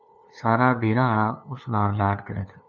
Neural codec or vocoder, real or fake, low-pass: codec, 16 kHz, 2 kbps, FunCodec, trained on LibriTTS, 25 frames a second; fake; 7.2 kHz